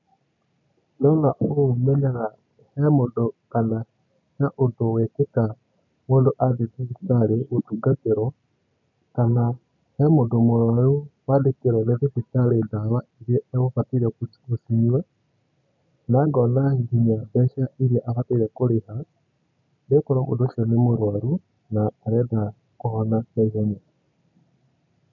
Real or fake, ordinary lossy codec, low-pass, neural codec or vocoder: real; none; 7.2 kHz; none